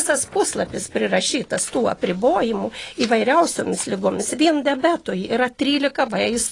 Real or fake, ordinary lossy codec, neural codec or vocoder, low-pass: real; AAC, 32 kbps; none; 10.8 kHz